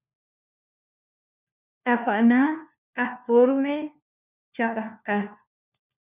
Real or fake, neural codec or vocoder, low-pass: fake; codec, 16 kHz, 1 kbps, FunCodec, trained on LibriTTS, 50 frames a second; 3.6 kHz